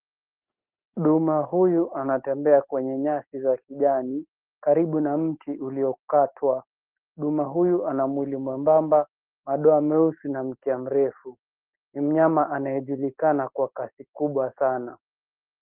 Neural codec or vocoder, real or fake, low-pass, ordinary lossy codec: none; real; 3.6 kHz; Opus, 16 kbps